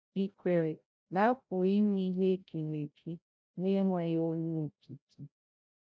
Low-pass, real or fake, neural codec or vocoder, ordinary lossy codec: none; fake; codec, 16 kHz, 0.5 kbps, FreqCodec, larger model; none